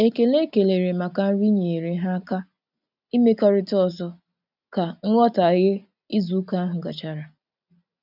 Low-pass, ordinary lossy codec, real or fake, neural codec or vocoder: 5.4 kHz; none; real; none